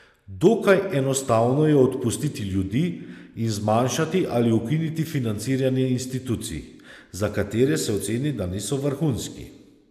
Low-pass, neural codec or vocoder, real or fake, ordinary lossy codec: 14.4 kHz; none; real; none